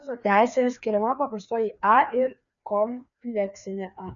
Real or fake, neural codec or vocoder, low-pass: fake; codec, 16 kHz, 2 kbps, FreqCodec, larger model; 7.2 kHz